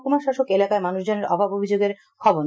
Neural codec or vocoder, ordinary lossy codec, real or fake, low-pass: none; none; real; none